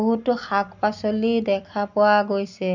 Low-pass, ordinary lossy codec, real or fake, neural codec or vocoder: 7.2 kHz; none; real; none